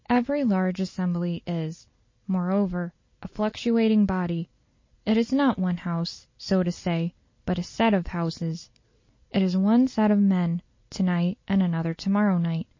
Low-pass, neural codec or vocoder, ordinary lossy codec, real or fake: 7.2 kHz; none; MP3, 32 kbps; real